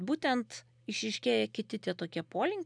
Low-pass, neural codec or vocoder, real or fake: 9.9 kHz; none; real